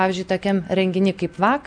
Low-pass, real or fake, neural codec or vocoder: 9.9 kHz; real; none